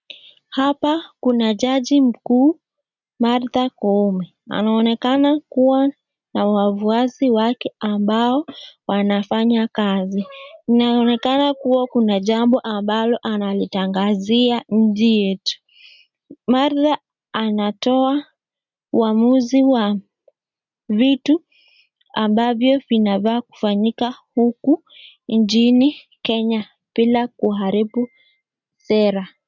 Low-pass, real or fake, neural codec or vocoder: 7.2 kHz; real; none